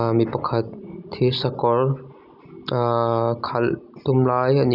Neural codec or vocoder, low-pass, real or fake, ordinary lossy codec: none; 5.4 kHz; real; none